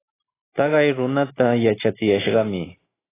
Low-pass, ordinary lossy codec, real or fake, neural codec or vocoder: 3.6 kHz; AAC, 16 kbps; real; none